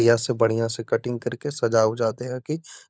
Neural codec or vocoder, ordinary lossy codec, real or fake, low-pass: codec, 16 kHz, 16 kbps, FunCodec, trained on LibriTTS, 50 frames a second; none; fake; none